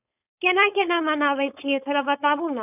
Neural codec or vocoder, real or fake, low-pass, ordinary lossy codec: codec, 16 kHz, 4.8 kbps, FACodec; fake; 3.6 kHz; none